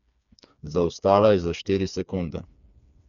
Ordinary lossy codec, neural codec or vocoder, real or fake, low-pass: none; codec, 16 kHz, 4 kbps, FreqCodec, smaller model; fake; 7.2 kHz